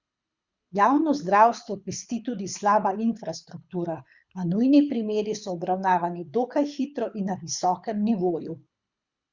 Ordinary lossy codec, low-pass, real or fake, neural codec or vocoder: Opus, 64 kbps; 7.2 kHz; fake; codec, 24 kHz, 6 kbps, HILCodec